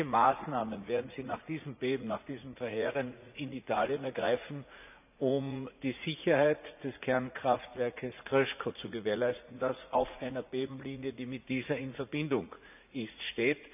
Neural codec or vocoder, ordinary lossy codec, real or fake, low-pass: vocoder, 44.1 kHz, 80 mel bands, Vocos; none; fake; 3.6 kHz